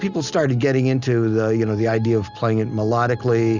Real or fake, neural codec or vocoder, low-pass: real; none; 7.2 kHz